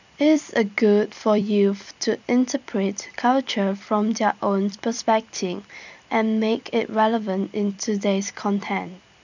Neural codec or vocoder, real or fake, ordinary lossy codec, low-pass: vocoder, 44.1 kHz, 128 mel bands every 256 samples, BigVGAN v2; fake; none; 7.2 kHz